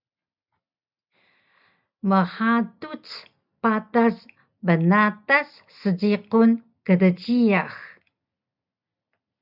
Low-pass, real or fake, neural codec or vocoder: 5.4 kHz; real; none